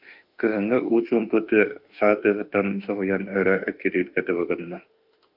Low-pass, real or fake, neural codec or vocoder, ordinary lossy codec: 5.4 kHz; fake; autoencoder, 48 kHz, 32 numbers a frame, DAC-VAE, trained on Japanese speech; Opus, 24 kbps